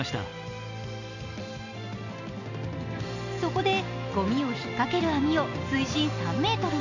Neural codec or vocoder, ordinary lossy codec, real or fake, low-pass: none; none; real; 7.2 kHz